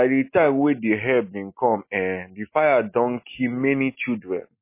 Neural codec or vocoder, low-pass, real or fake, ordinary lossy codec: none; 3.6 kHz; real; MP3, 24 kbps